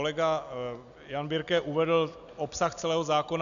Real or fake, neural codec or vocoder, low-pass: real; none; 7.2 kHz